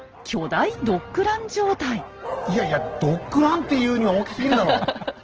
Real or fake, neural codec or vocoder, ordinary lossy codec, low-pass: real; none; Opus, 16 kbps; 7.2 kHz